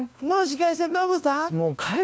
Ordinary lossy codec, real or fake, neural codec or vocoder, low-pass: none; fake; codec, 16 kHz, 1 kbps, FunCodec, trained on LibriTTS, 50 frames a second; none